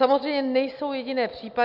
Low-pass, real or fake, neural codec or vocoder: 5.4 kHz; real; none